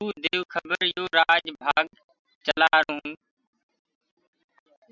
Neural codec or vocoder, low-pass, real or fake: none; 7.2 kHz; real